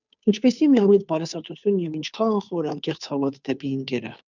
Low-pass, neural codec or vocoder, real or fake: 7.2 kHz; codec, 16 kHz, 2 kbps, FunCodec, trained on Chinese and English, 25 frames a second; fake